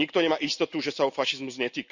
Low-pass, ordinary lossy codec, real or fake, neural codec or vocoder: 7.2 kHz; none; real; none